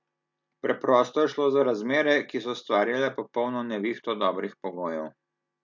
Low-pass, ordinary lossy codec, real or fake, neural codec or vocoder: 7.2 kHz; MP3, 64 kbps; real; none